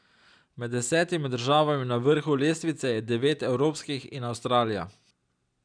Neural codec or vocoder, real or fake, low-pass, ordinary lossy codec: none; real; 9.9 kHz; none